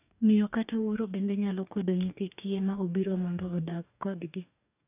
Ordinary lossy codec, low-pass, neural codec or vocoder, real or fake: none; 3.6 kHz; codec, 44.1 kHz, 2.6 kbps, DAC; fake